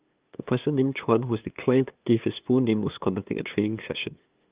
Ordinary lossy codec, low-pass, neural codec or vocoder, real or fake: Opus, 32 kbps; 3.6 kHz; codec, 16 kHz, 2 kbps, FunCodec, trained on LibriTTS, 25 frames a second; fake